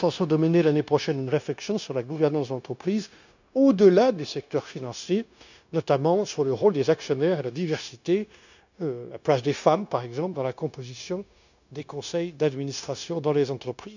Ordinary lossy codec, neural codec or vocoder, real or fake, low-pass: none; codec, 16 kHz, 0.9 kbps, LongCat-Audio-Codec; fake; 7.2 kHz